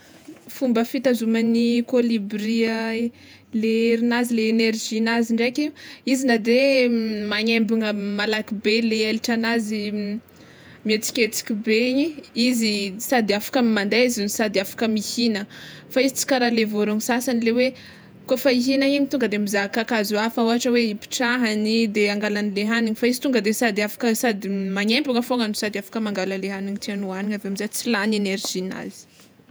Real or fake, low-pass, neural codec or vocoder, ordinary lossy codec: fake; none; vocoder, 48 kHz, 128 mel bands, Vocos; none